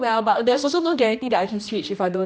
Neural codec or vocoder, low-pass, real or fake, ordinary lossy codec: codec, 16 kHz, 1 kbps, X-Codec, HuBERT features, trained on general audio; none; fake; none